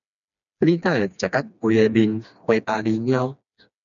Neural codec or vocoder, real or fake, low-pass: codec, 16 kHz, 2 kbps, FreqCodec, smaller model; fake; 7.2 kHz